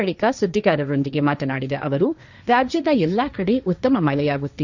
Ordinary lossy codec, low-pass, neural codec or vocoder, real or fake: none; 7.2 kHz; codec, 16 kHz, 1.1 kbps, Voila-Tokenizer; fake